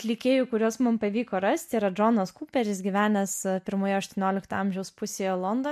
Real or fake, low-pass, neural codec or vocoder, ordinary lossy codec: real; 14.4 kHz; none; MP3, 64 kbps